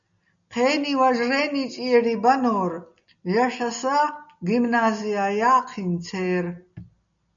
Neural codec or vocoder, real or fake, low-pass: none; real; 7.2 kHz